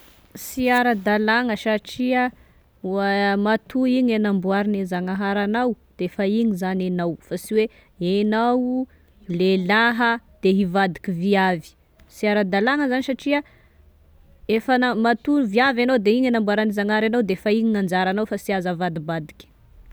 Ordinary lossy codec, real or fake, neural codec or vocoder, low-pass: none; real; none; none